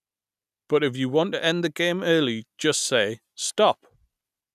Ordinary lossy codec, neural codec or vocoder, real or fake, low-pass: none; none; real; 14.4 kHz